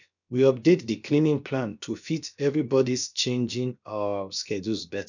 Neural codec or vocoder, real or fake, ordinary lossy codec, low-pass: codec, 16 kHz, about 1 kbps, DyCAST, with the encoder's durations; fake; none; 7.2 kHz